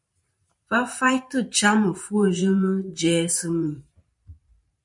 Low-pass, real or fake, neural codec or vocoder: 10.8 kHz; fake; vocoder, 44.1 kHz, 128 mel bands every 512 samples, BigVGAN v2